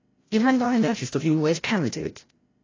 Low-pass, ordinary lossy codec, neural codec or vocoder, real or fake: 7.2 kHz; AAC, 32 kbps; codec, 16 kHz, 0.5 kbps, FreqCodec, larger model; fake